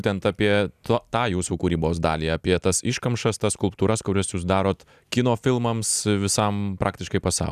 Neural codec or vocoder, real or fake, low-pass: none; real; 14.4 kHz